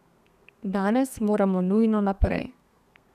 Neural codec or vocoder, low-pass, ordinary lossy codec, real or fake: codec, 32 kHz, 1.9 kbps, SNAC; 14.4 kHz; none; fake